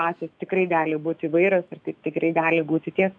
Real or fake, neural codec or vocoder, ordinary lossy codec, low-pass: fake; autoencoder, 48 kHz, 128 numbers a frame, DAC-VAE, trained on Japanese speech; MP3, 96 kbps; 9.9 kHz